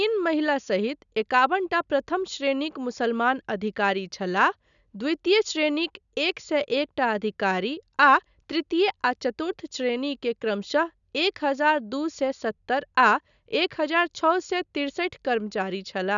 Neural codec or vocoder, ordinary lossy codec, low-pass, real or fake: none; none; 7.2 kHz; real